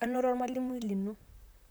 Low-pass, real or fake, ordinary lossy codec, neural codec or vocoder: none; fake; none; vocoder, 44.1 kHz, 128 mel bands, Pupu-Vocoder